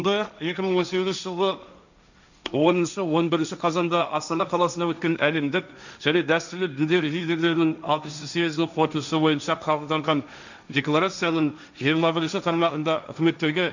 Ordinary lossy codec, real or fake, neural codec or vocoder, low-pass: none; fake; codec, 16 kHz, 1.1 kbps, Voila-Tokenizer; 7.2 kHz